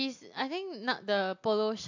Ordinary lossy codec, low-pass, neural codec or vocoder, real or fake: AAC, 48 kbps; 7.2 kHz; none; real